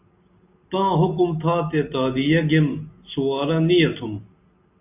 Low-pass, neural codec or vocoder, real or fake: 3.6 kHz; none; real